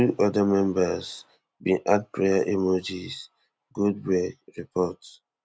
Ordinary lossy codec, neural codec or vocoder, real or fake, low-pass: none; none; real; none